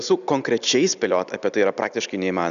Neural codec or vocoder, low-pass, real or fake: none; 7.2 kHz; real